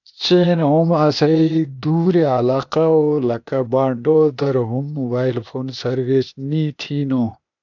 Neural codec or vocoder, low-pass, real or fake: codec, 16 kHz, 0.8 kbps, ZipCodec; 7.2 kHz; fake